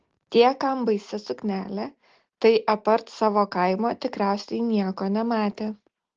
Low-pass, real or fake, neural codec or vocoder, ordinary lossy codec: 7.2 kHz; real; none; Opus, 16 kbps